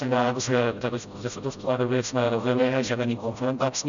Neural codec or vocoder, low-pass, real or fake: codec, 16 kHz, 0.5 kbps, FreqCodec, smaller model; 7.2 kHz; fake